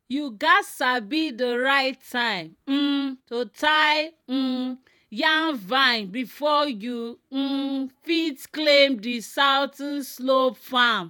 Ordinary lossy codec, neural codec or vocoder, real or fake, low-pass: none; vocoder, 48 kHz, 128 mel bands, Vocos; fake; 19.8 kHz